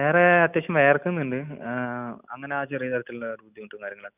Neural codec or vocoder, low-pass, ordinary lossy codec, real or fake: none; 3.6 kHz; none; real